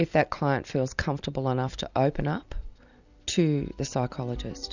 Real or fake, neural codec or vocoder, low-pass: real; none; 7.2 kHz